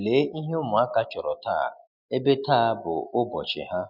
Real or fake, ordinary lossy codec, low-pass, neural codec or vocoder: real; none; 5.4 kHz; none